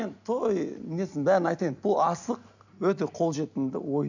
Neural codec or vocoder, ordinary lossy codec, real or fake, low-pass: none; none; real; 7.2 kHz